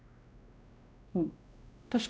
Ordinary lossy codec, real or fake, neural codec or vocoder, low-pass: none; fake; codec, 16 kHz, 1 kbps, X-Codec, WavLM features, trained on Multilingual LibriSpeech; none